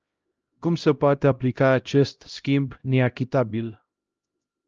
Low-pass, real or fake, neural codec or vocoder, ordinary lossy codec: 7.2 kHz; fake; codec, 16 kHz, 0.5 kbps, X-Codec, HuBERT features, trained on LibriSpeech; Opus, 24 kbps